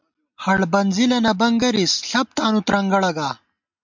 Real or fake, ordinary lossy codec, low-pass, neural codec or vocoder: real; MP3, 64 kbps; 7.2 kHz; none